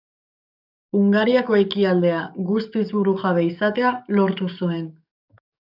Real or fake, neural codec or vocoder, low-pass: fake; codec, 44.1 kHz, 7.8 kbps, DAC; 5.4 kHz